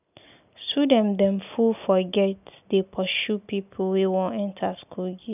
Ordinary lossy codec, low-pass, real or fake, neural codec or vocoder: none; 3.6 kHz; real; none